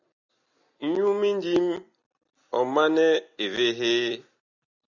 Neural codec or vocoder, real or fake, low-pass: none; real; 7.2 kHz